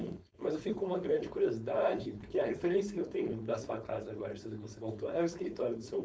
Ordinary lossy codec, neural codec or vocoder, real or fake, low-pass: none; codec, 16 kHz, 4.8 kbps, FACodec; fake; none